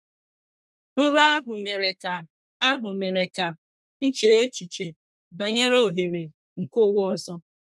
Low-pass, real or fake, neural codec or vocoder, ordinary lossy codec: none; fake; codec, 24 kHz, 1 kbps, SNAC; none